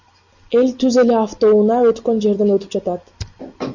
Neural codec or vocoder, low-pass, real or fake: none; 7.2 kHz; real